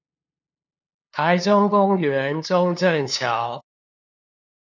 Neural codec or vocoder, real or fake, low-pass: codec, 16 kHz, 2 kbps, FunCodec, trained on LibriTTS, 25 frames a second; fake; 7.2 kHz